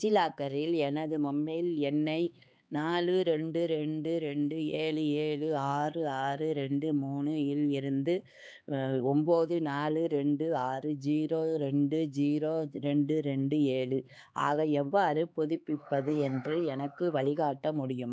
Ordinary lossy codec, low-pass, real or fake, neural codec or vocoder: none; none; fake; codec, 16 kHz, 4 kbps, X-Codec, HuBERT features, trained on LibriSpeech